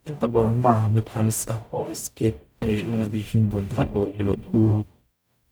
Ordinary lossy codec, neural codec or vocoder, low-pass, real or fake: none; codec, 44.1 kHz, 0.9 kbps, DAC; none; fake